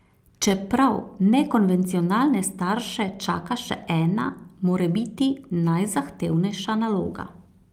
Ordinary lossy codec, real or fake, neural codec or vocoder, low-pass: Opus, 32 kbps; real; none; 19.8 kHz